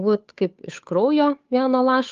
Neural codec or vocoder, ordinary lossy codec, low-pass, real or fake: codec, 16 kHz, 8 kbps, FunCodec, trained on Chinese and English, 25 frames a second; Opus, 32 kbps; 7.2 kHz; fake